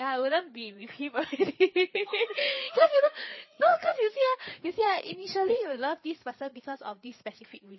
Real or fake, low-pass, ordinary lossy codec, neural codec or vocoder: fake; 7.2 kHz; MP3, 24 kbps; codec, 16 kHz, 4 kbps, FreqCodec, larger model